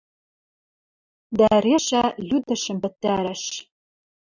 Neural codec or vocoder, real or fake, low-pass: none; real; 7.2 kHz